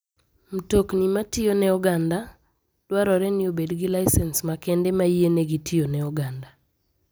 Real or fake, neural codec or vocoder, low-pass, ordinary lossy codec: real; none; none; none